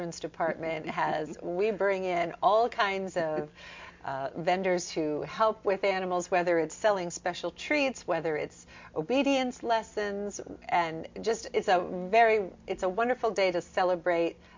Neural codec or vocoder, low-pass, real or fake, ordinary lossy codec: none; 7.2 kHz; real; MP3, 48 kbps